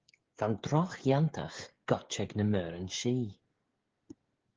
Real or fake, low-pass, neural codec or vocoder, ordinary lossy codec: fake; 7.2 kHz; codec, 16 kHz, 16 kbps, FreqCodec, smaller model; Opus, 16 kbps